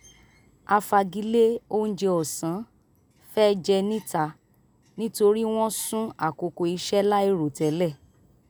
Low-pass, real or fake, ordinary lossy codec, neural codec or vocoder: none; real; none; none